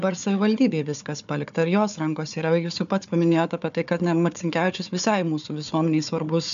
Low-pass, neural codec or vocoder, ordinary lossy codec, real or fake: 7.2 kHz; codec, 16 kHz, 4 kbps, FunCodec, trained on Chinese and English, 50 frames a second; AAC, 96 kbps; fake